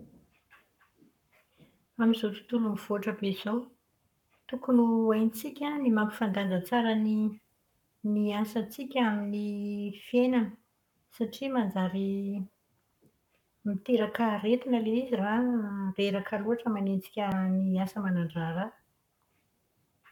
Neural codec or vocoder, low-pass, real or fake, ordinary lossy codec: codec, 44.1 kHz, 7.8 kbps, Pupu-Codec; 19.8 kHz; fake; none